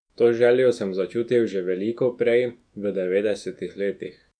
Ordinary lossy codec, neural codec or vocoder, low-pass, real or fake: none; autoencoder, 48 kHz, 128 numbers a frame, DAC-VAE, trained on Japanese speech; 9.9 kHz; fake